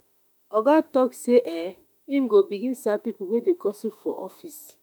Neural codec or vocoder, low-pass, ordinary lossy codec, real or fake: autoencoder, 48 kHz, 32 numbers a frame, DAC-VAE, trained on Japanese speech; none; none; fake